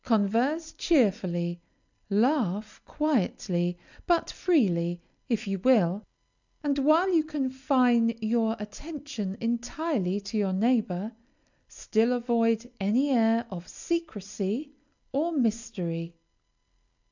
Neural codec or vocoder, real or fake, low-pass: none; real; 7.2 kHz